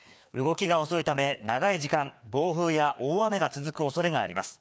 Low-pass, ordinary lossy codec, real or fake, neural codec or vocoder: none; none; fake; codec, 16 kHz, 2 kbps, FreqCodec, larger model